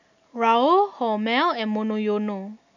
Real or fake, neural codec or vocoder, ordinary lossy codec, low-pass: real; none; none; 7.2 kHz